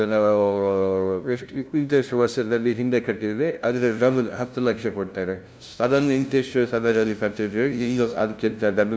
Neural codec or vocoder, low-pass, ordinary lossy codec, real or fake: codec, 16 kHz, 0.5 kbps, FunCodec, trained on LibriTTS, 25 frames a second; none; none; fake